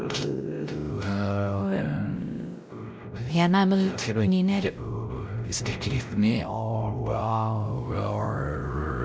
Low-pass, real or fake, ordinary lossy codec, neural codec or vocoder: none; fake; none; codec, 16 kHz, 0.5 kbps, X-Codec, WavLM features, trained on Multilingual LibriSpeech